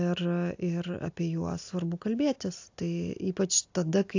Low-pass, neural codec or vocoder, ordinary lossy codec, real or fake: 7.2 kHz; none; AAC, 48 kbps; real